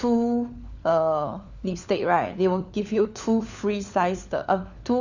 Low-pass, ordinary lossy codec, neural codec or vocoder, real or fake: 7.2 kHz; none; codec, 16 kHz, 4 kbps, FunCodec, trained on LibriTTS, 50 frames a second; fake